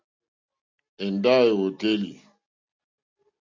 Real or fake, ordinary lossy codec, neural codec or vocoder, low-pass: real; MP3, 64 kbps; none; 7.2 kHz